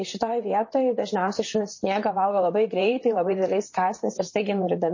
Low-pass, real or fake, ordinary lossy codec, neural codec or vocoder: 7.2 kHz; fake; MP3, 32 kbps; vocoder, 44.1 kHz, 128 mel bands, Pupu-Vocoder